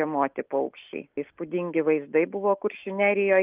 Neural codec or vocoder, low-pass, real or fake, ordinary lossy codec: none; 3.6 kHz; real; Opus, 24 kbps